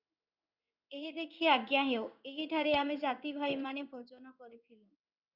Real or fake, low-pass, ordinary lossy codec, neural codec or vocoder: fake; 5.4 kHz; Opus, 64 kbps; codec, 16 kHz in and 24 kHz out, 1 kbps, XY-Tokenizer